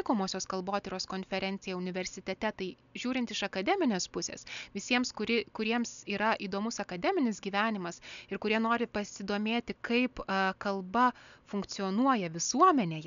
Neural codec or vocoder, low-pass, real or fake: none; 7.2 kHz; real